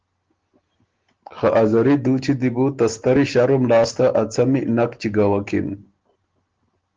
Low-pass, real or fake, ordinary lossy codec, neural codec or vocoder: 7.2 kHz; real; Opus, 16 kbps; none